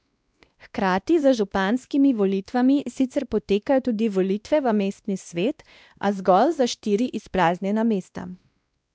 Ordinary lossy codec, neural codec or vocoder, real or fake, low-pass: none; codec, 16 kHz, 1 kbps, X-Codec, WavLM features, trained on Multilingual LibriSpeech; fake; none